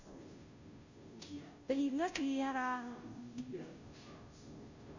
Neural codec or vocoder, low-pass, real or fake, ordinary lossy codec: codec, 16 kHz, 0.5 kbps, FunCodec, trained on Chinese and English, 25 frames a second; 7.2 kHz; fake; none